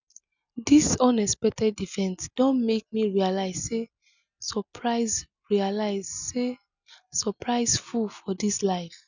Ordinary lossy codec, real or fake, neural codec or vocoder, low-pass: none; real; none; 7.2 kHz